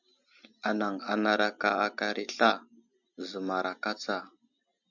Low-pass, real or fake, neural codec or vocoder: 7.2 kHz; real; none